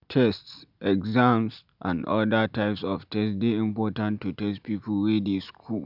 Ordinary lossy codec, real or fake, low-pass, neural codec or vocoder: none; real; 5.4 kHz; none